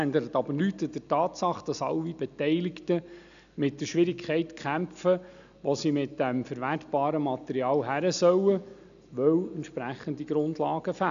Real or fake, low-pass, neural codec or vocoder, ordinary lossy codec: real; 7.2 kHz; none; none